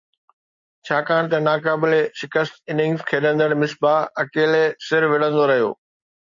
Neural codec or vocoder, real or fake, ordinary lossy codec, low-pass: none; real; MP3, 48 kbps; 7.2 kHz